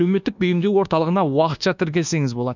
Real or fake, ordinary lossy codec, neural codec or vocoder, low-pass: fake; none; codec, 24 kHz, 1.2 kbps, DualCodec; 7.2 kHz